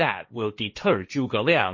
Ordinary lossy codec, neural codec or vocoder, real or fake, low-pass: MP3, 32 kbps; codec, 16 kHz, 1.1 kbps, Voila-Tokenizer; fake; 7.2 kHz